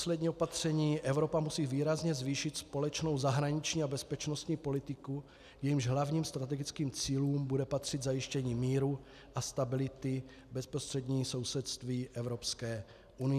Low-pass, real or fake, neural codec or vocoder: 14.4 kHz; real; none